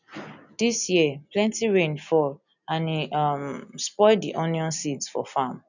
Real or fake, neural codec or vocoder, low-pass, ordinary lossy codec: real; none; 7.2 kHz; none